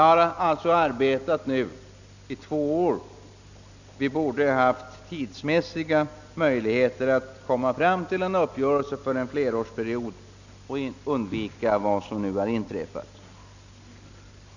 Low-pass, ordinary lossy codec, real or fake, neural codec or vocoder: 7.2 kHz; none; real; none